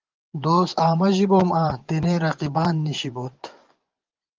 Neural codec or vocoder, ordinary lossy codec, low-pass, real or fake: vocoder, 24 kHz, 100 mel bands, Vocos; Opus, 32 kbps; 7.2 kHz; fake